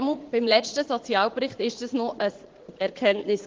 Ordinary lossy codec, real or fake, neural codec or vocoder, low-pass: Opus, 16 kbps; fake; vocoder, 44.1 kHz, 80 mel bands, Vocos; 7.2 kHz